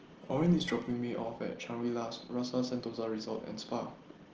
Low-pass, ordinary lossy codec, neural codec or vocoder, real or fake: 7.2 kHz; Opus, 16 kbps; none; real